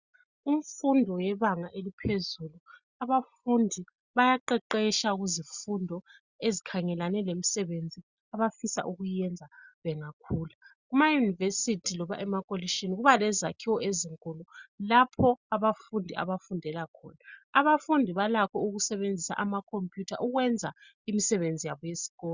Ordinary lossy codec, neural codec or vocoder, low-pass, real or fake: Opus, 64 kbps; none; 7.2 kHz; real